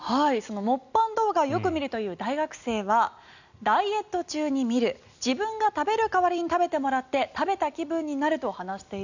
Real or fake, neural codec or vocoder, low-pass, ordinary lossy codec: real; none; 7.2 kHz; none